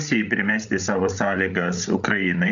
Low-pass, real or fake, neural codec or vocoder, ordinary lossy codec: 7.2 kHz; fake; codec, 16 kHz, 8 kbps, FreqCodec, larger model; MP3, 96 kbps